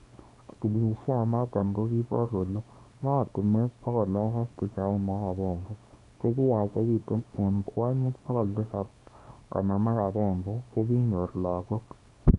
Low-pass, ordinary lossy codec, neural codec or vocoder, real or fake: 10.8 kHz; none; codec, 24 kHz, 0.9 kbps, WavTokenizer, small release; fake